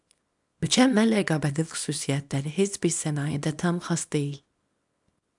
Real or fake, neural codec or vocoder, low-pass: fake; codec, 24 kHz, 0.9 kbps, WavTokenizer, small release; 10.8 kHz